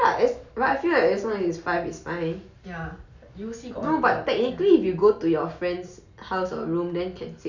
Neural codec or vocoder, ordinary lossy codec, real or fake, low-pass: none; none; real; 7.2 kHz